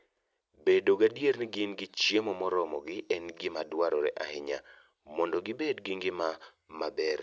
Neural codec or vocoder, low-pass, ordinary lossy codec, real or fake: none; none; none; real